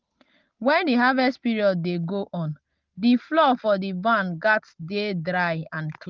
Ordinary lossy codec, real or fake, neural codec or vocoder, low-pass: Opus, 24 kbps; real; none; 7.2 kHz